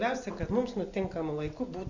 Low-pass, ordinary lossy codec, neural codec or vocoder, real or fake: 7.2 kHz; Opus, 64 kbps; none; real